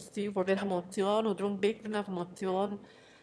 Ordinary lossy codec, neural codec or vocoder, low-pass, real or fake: none; autoencoder, 22.05 kHz, a latent of 192 numbers a frame, VITS, trained on one speaker; none; fake